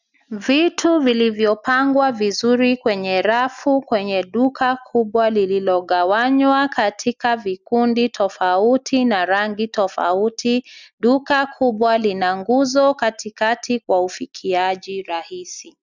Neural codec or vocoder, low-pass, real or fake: none; 7.2 kHz; real